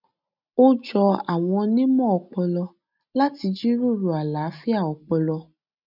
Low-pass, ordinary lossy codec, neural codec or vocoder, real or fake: 5.4 kHz; none; none; real